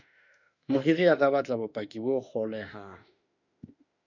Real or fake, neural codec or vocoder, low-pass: fake; autoencoder, 48 kHz, 32 numbers a frame, DAC-VAE, trained on Japanese speech; 7.2 kHz